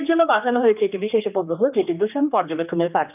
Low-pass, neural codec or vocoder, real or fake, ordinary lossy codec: 3.6 kHz; codec, 16 kHz, 2 kbps, X-Codec, HuBERT features, trained on general audio; fake; none